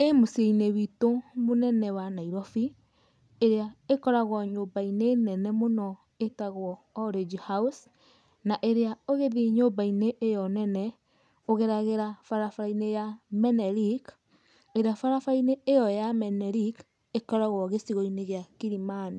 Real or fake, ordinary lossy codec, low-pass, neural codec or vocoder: real; none; none; none